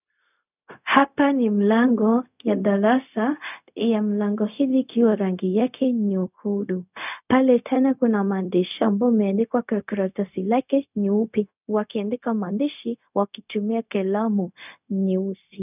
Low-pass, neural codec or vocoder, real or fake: 3.6 kHz; codec, 16 kHz, 0.4 kbps, LongCat-Audio-Codec; fake